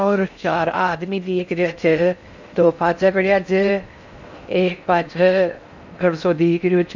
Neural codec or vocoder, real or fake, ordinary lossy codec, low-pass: codec, 16 kHz in and 24 kHz out, 0.6 kbps, FocalCodec, streaming, 4096 codes; fake; none; 7.2 kHz